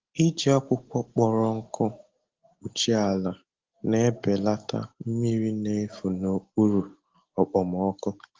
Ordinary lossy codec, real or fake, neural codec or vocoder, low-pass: Opus, 16 kbps; real; none; 7.2 kHz